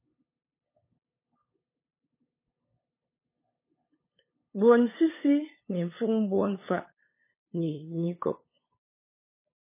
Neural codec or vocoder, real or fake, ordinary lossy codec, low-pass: codec, 16 kHz, 8 kbps, FunCodec, trained on LibriTTS, 25 frames a second; fake; MP3, 16 kbps; 3.6 kHz